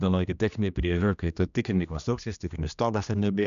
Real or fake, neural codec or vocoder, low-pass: fake; codec, 16 kHz, 1 kbps, X-Codec, HuBERT features, trained on general audio; 7.2 kHz